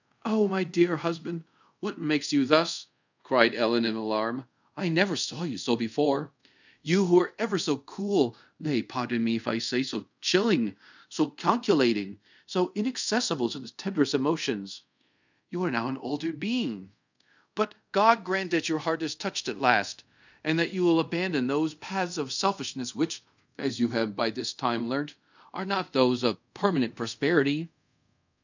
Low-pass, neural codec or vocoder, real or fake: 7.2 kHz; codec, 24 kHz, 0.5 kbps, DualCodec; fake